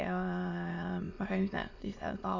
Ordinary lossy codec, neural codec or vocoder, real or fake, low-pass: none; autoencoder, 22.05 kHz, a latent of 192 numbers a frame, VITS, trained on many speakers; fake; 7.2 kHz